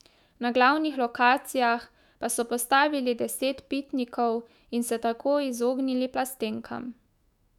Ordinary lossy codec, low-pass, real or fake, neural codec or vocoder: none; 19.8 kHz; fake; autoencoder, 48 kHz, 128 numbers a frame, DAC-VAE, trained on Japanese speech